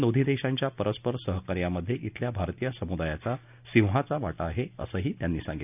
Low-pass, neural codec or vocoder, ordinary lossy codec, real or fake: 3.6 kHz; none; none; real